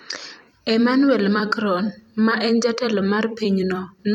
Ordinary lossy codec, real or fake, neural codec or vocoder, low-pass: none; fake; vocoder, 44.1 kHz, 128 mel bands every 256 samples, BigVGAN v2; 19.8 kHz